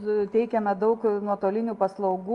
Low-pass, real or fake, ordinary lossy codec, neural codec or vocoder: 10.8 kHz; real; Opus, 32 kbps; none